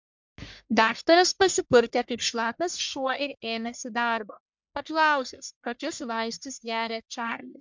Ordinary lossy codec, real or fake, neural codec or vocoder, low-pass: MP3, 64 kbps; fake; codec, 44.1 kHz, 1.7 kbps, Pupu-Codec; 7.2 kHz